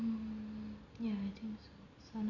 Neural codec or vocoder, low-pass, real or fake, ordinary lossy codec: none; 7.2 kHz; real; none